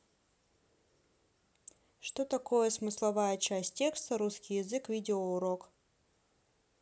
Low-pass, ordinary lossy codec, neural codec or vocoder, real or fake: none; none; none; real